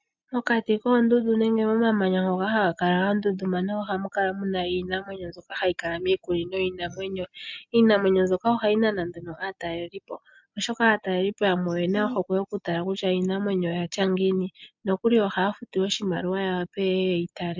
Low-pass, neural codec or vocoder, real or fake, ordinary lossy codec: 7.2 kHz; none; real; MP3, 64 kbps